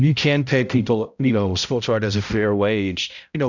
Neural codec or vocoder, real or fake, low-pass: codec, 16 kHz, 0.5 kbps, X-Codec, HuBERT features, trained on balanced general audio; fake; 7.2 kHz